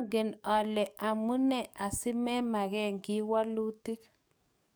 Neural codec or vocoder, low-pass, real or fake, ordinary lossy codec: codec, 44.1 kHz, 7.8 kbps, DAC; none; fake; none